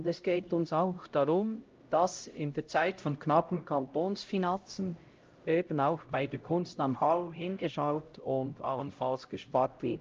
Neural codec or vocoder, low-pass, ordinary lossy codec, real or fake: codec, 16 kHz, 0.5 kbps, X-Codec, HuBERT features, trained on LibriSpeech; 7.2 kHz; Opus, 24 kbps; fake